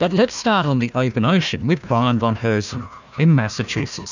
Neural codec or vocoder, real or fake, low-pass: codec, 16 kHz, 1 kbps, FunCodec, trained on Chinese and English, 50 frames a second; fake; 7.2 kHz